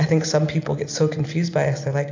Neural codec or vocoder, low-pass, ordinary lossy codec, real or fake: none; 7.2 kHz; AAC, 48 kbps; real